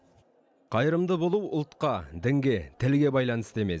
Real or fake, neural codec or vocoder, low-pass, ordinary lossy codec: real; none; none; none